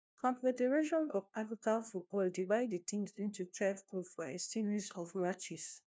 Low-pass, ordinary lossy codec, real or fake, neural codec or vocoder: none; none; fake; codec, 16 kHz, 1 kbps, FunCodec, trained on LibriTTS, 50 frames a second